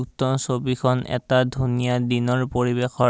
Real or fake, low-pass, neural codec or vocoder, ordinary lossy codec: real; none; none; none